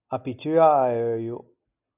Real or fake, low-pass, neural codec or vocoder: real; 3.6 kHz; none